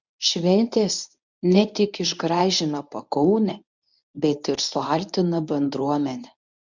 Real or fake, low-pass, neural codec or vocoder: fake; 7.2 kHz; codec, 24 kHz, 0.9 kbps, WavTokenizer, medium speech release version 1